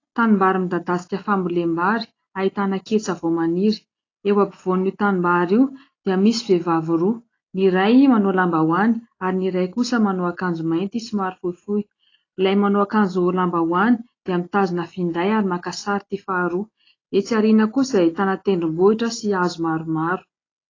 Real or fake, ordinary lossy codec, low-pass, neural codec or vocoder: real; AAC, 32 kbps; 7.2 kHz; none